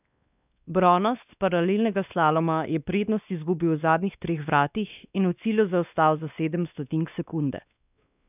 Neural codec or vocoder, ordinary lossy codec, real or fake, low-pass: codec, 16 kHz, 2 kbps, X-Codec, WavLM features, trained on Multilingual LibriSpeech; none; fake; 3.6 kHz